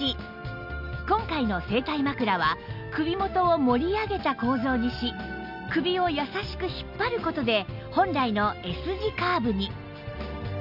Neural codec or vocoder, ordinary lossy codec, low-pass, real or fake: none; none; 5.4 kHz; real